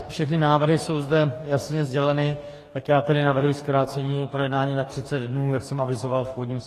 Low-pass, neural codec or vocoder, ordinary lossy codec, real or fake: 14.4 kHz; codec, 44.1 kHz, 2.6 kbps, DAC; AAC, 48 kbps; fake